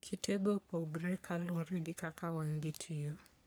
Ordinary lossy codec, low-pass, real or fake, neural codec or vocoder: none; none; fake; codec, 44.1 kHz, 3.4 kbps, Pupu-Codec